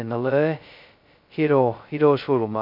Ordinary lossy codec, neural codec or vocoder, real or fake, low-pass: MP3, 48 kbps; codec, 16 kHz, 0.2 kbps, FocalCodec; fake; 5.4 kHz